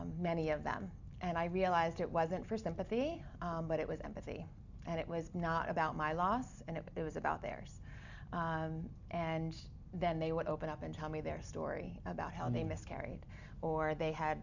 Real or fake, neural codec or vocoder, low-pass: real; none; 7.2 kHz